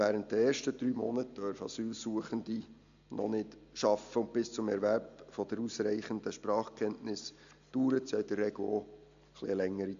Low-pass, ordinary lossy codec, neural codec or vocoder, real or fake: 7.2 kHz; MP3, 64 kbps; none; real